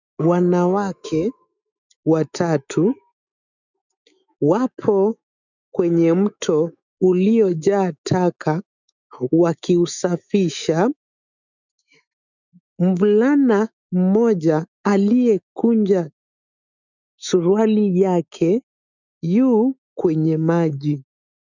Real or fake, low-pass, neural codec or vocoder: fake; 7.2 kHz; autoencoder, 48 kHz, 128 numbers a frame, DAC-VAE, trained on Japanese speech